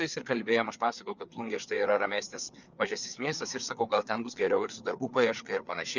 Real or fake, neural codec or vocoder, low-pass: fake; codec, 16 kHz, 4 kbps, FreqCodec, larger model; 7.2 kHz